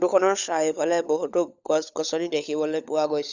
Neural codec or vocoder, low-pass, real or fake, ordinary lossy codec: codec, 16 kHz, 16 kbps, FunCodec, trained on LibriTTS, 50 frames a second; 7.2 kHz; fake; none